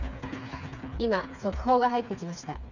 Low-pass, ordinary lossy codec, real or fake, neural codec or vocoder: 7.2 kHz; none; fake; codec, 16 kHz, 4 kbps, FreqCodec, smaller model